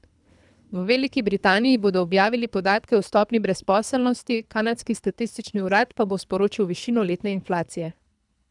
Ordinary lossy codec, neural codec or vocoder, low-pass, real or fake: none; codec, 24 kHz, 3 kbps, HILCodec; 10.8 kHz; fake